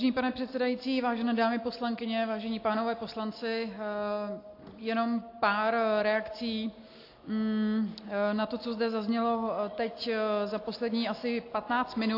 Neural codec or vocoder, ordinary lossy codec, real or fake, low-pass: none; AAC, 32 kbps; real; 5.4 kHz